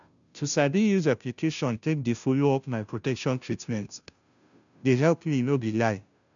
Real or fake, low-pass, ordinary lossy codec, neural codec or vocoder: fake; 7.2 kHz; none; codec, 16 kHz, 0.5 kbps, FunCodec, trained on Chinese and English, 25 frames a second